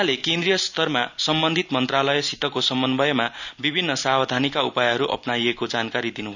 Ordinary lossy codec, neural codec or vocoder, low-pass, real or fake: none; none; 7.2 kHz; real